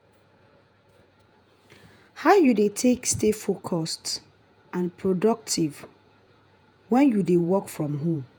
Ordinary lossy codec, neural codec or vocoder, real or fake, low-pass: none; vocoder, 48 kHz, 128 mel bands, Vocos; fake; none